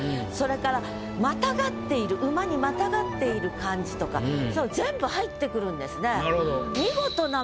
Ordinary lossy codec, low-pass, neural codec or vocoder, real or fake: none; none; none; real